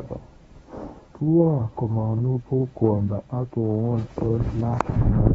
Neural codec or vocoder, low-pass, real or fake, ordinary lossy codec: codec, 24 kHz, 0.9 kbps, WavTokenizer, medium speech release version 1; 10.8 kHz; fake; AAC, 24 kbps